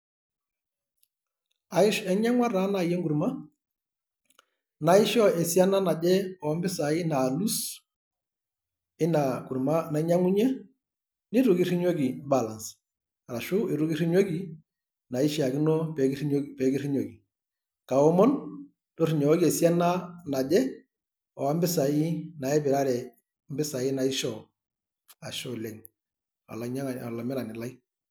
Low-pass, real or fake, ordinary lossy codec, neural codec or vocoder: none; real; none; none